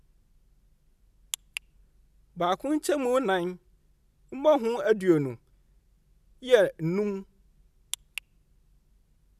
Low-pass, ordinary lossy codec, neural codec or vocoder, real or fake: 14.4 kHz; none; none; real